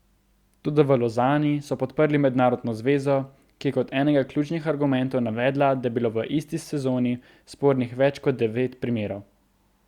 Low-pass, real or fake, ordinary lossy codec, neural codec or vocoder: 19.8 kHz; real; Opus, 64 kbps; none